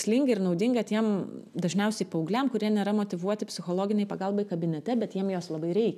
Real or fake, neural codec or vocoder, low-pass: real; none; 14.4 kHz